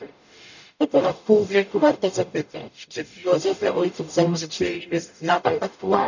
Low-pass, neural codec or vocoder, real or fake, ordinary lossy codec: 7.2 kHz; codec, 44.1 kHz, 0.9 kbps, DAC; fake; none